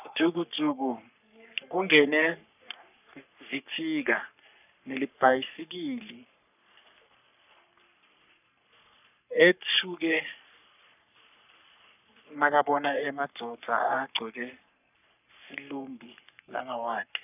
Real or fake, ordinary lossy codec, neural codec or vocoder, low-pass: fake; none; codec, 44.1 kHz, 3.4 kbps, Pupu-Codec; 3.6 kHz